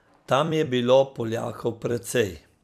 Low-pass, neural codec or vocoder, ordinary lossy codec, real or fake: 14.4 kHz; vocoder, 44.1 kHz, 128 mel bands every 256 samples, BigVGAN v2; none; fake